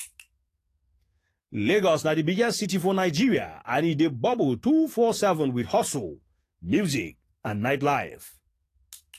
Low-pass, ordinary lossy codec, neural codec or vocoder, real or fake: 14.4 kHz; AAC, 48 kbps; codec, 44.1 kHz, 7.8 kbps, DAC; fake